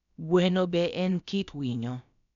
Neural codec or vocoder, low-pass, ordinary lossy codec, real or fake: codec, 16 kHz, about 1 kbps, DyCAST, with the encoder's durations; 7.2 kHz; none; fake